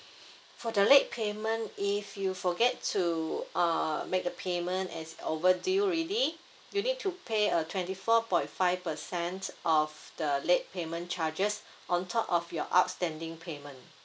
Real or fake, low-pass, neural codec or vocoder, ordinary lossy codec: real; none; none; none